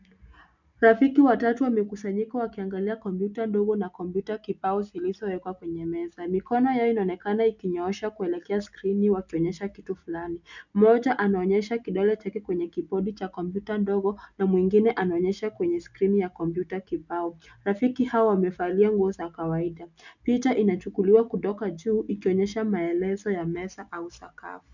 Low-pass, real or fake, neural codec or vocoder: 7.2 kHz; real; none